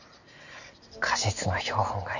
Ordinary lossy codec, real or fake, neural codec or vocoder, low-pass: none; real; none; 7.2 kHz